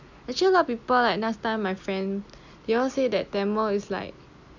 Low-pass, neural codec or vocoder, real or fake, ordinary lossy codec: 7.2 kHz; none; real; none